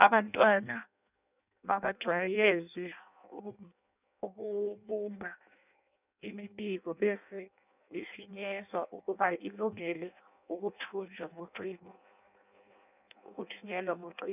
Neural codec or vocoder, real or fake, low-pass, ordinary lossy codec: codec, 16 kHz in and 24 kHz out, 0.6 kbps, FireRedTTS-2 codec; fake; 3.6 kHz; none